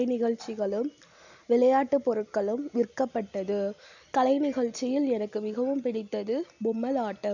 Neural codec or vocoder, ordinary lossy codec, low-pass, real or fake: none; none; 7.2 kHz; real